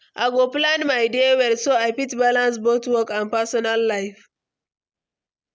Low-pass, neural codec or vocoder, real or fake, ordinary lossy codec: none; none; real; none